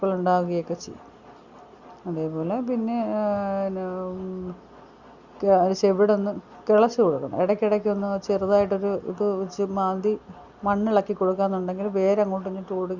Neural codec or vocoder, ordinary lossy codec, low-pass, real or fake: none; Opus, 64 kbps; 7.2 kHz; real